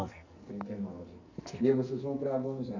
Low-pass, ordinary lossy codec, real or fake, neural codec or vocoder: 7.2 kHz; none; fake; codec, 44.1 kHz, 2.6 kbps, SNAC